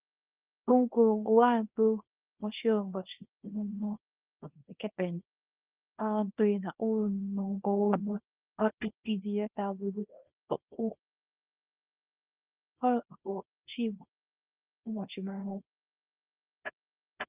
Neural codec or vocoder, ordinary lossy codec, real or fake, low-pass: codec, 24 kHz, 0.9 kbps, WavTokenizer, small release; Opus, 32 kbps; fake; 3.6 kHz